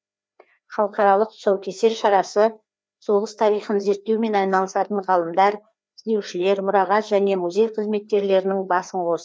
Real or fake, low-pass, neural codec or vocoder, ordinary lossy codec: fake; none; codec, 16 kHz, 2 kbps, FreqCodec, larger model; none